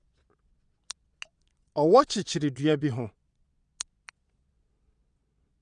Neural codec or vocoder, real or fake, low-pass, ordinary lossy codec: vocoder, 22.05 kHz, 80 mel bands, Vocos; fake; 9.9 kHz; none